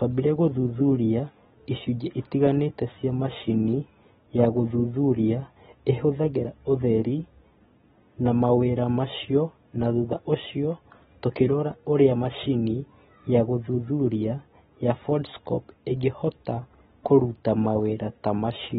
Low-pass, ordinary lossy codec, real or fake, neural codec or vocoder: 7.2 kHz; AAC, 16 kbps; real; none